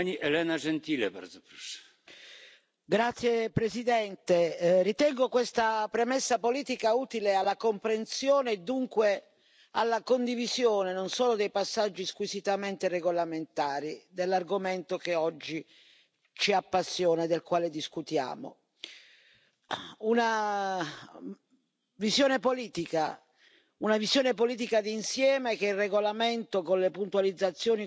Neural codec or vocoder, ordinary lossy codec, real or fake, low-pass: none; none; real; none